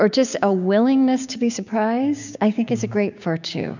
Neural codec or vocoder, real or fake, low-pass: codec, 16 kHz, 6 kbps, DAC; fake; 7.2 kHz